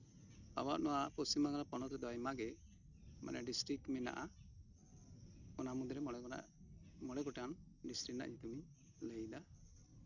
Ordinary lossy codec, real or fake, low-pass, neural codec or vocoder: none; real; 7.2 kHz; none